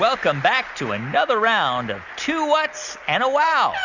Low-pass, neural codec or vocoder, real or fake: 7.2 kHz; none; real